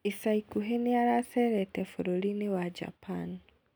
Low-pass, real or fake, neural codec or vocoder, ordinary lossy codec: none; real; none; none